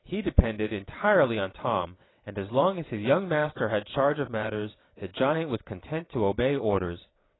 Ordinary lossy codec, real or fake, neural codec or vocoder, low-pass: AAC, 16 kbps; fake; vocoder, 22.05 kHz, 80 mel bands, WaveNeXt; 7.2 kHz